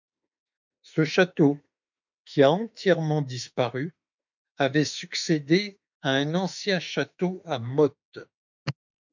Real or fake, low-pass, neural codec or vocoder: fake; 7.2 kHz; autoencoder, 48 kHz, 32 numbers a frame, DAC-VAE, trained on Japanese speech